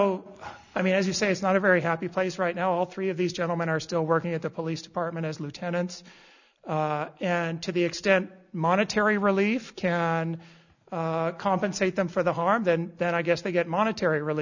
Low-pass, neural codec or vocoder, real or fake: 7.2 kHz; none; real